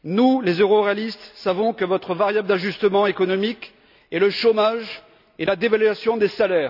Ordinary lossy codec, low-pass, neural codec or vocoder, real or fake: none; 5.4 kHz; none; real